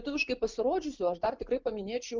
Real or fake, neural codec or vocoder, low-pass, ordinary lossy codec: real; none; 7.2 kHz; Opus, 32 kbps